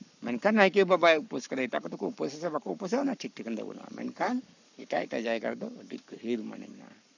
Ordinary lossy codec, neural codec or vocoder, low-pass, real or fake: none; codec, 44.1 kHz, 7.8 kbps, Pupu-Codec; 7.2 kHz; fake